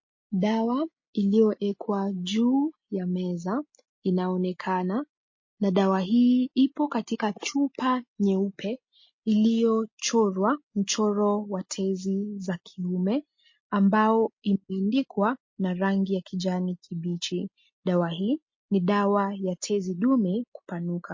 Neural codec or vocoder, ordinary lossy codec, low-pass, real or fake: none; MP3, 32 kbps; 7.2 kHz; real